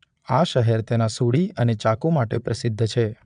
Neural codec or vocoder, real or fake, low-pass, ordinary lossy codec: vocoder, 22.05 kHz, 80 mel bands, Vocos; fake; 9.9 kHz; none